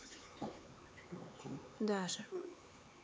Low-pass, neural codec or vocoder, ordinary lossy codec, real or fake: none; codec, 16 kHz, 4 kbps, X-Codec, HuBERT features, trained on LibriSpeech; none; fake